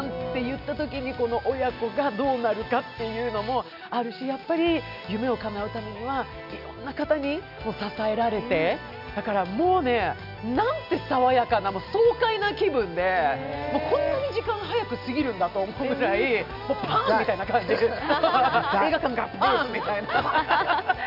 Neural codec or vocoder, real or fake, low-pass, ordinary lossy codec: none; real; 5.4 kHz; none